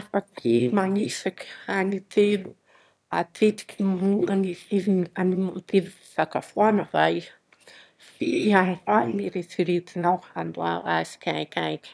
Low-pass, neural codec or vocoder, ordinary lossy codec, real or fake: none; autoencoder, 22.05 kHz, a latent of 192 numbers a frame, VITS, trained on one speaker; none; fake